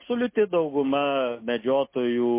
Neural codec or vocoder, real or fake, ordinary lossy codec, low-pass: none; real; MP3, 24 kbps; 3.6 kHz